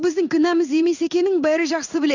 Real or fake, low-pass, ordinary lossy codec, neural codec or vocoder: fake; 7.2 kHz; none; codec, 16 kHz in and 24 kHz out, 1 kbps, XY-Tokenizer